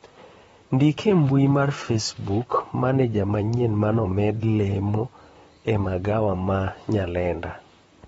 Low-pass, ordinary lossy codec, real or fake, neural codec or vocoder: 19.8 kHz; AAC, 24 kbps; fake; vocoder, 44.1 kHz, 128 mel bands, Pupu-Vocoder